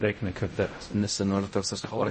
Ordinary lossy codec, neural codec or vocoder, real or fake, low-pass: MP3, 32 kbps; codec, 16 kHz in and 24 kHz out, 0.4 kbps, LongCat-Audio-Codec, fine tuned four codebook decoder; fake; 10.8 kHz